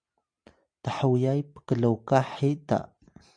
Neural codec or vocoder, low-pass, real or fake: none; 9.9 kHz; real